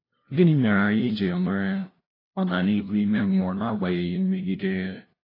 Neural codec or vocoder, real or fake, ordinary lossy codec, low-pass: codec, 16 kHz, 0.5 kbps, FunCodec, trained on LibriTTS, 25 frames a second; fake; AAC, 24 kbps; 5.4 kHz